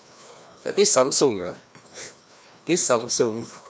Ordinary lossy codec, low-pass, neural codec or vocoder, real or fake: none; none; codec, 16 kHz, 1 kbps, FreqCodec, larger model; fake